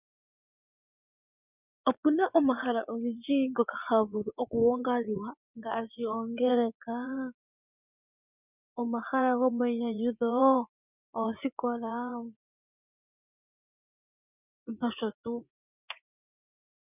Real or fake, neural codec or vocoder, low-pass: fake; vocoder, 24 kHz, 100 mel bands, Vocos; 3.6 kHz